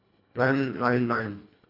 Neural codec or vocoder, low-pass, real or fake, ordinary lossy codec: codec, 24 kHz, 1.5 kbps, HILCodec; 5.4 kHz; fake; AAC, 32 kbps